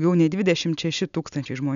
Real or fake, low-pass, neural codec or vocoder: real; 7.2 kHz; none